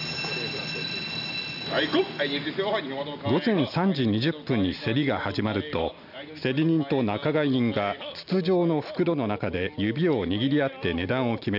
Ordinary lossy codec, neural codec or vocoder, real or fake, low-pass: none; none; real; 5.4 kHz